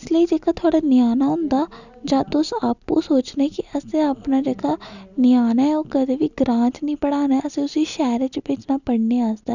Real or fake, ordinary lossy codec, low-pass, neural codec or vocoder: real; none; 7.2 kHz; none